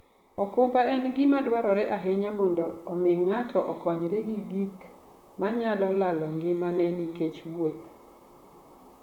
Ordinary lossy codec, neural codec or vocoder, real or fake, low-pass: none; vocoder, 44.1 kHz, 128 mel bands, Pupu-Vocoder; fake; 19.8 kHz